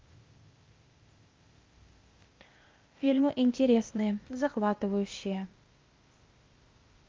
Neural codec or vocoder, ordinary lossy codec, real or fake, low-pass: codec, 16 kHz, 0.8 kbps, ZipCodec; Opus, 32 kbps; fake; 7.2 kHz